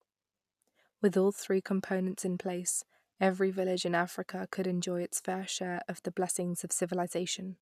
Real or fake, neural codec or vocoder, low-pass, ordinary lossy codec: real; none; 14.4 kHz; none